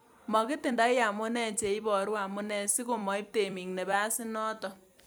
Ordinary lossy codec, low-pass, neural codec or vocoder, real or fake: none; none; vocoder, 44.1 kHz, 128 mel bands every 256 samples, BigVGAN v2; fake